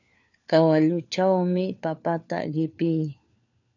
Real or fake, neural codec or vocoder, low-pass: fake; codec, 16 kHz, 4 kbps, FunCodec, trained on LibriTTS, 50 frames a second; 7.2 kHz